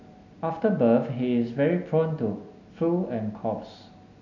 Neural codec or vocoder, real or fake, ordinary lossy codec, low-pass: none; real; none; 7.2 kHz